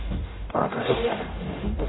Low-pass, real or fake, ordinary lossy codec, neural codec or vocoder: 7.2 kHz; fake; AAC, 16 kbps; codec, 44.1 kHz, 0.9 kbps, DAC